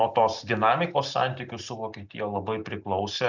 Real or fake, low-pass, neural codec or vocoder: real; 7.2 kHz; none